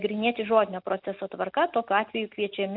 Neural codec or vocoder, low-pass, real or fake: none; 5.4 kHz; real